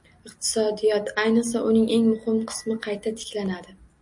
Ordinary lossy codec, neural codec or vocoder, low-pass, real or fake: MP3, 48 kbps; none; 10.8 kHz; real